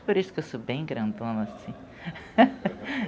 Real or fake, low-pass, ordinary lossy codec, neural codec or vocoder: real; none; none; none